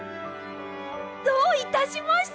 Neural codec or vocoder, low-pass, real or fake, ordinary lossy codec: none; none; real; none